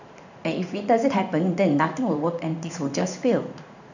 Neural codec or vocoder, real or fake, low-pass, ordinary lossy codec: codec, 16 kHz in and 24 kHz out, 1 kbps, XY-Tokenizer; fake; 7.2 kHz; none